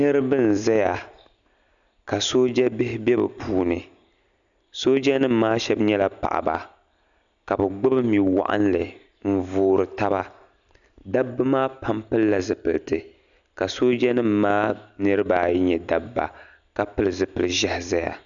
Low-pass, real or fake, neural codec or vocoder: 7.2 kHz; real; none